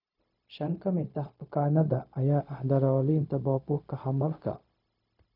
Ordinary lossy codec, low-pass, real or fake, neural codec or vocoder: none; 5.4 kHz; fake; codec, 16 kHz, 0.4 kbps, LongCat-Audio-Codec